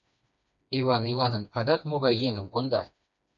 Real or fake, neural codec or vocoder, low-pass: fake; codec, 16 kHz, 2 kbps, FreqCodec, smaller model; 7.2 kHz